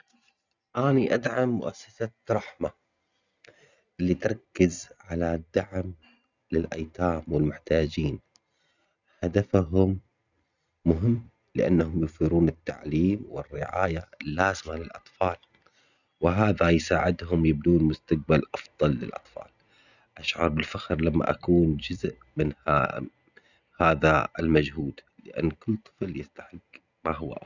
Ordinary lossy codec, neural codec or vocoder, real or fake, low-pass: none; none; real; 7.2 kHz